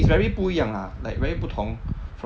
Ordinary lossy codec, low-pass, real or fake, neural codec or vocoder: none; none; real; none